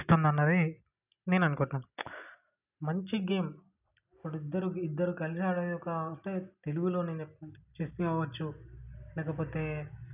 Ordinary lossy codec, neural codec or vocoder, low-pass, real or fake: none; none; 3.6 kHz; real